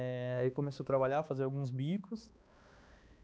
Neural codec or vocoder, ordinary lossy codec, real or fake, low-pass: codec, 16 kHz, 2 kbps, X-Codec, HuBERT features, trained on balanced general audio; none; fake; none